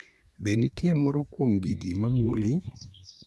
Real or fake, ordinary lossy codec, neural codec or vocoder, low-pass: fake; none; codec, 24 kHz, 1 kbps, SNAC; none